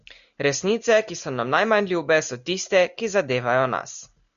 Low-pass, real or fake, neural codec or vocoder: 7.2 kHz; real; none